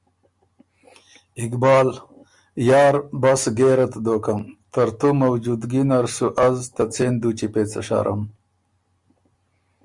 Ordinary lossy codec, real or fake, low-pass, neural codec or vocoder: Opus, 64 kbps; real; 10.8 kHz; none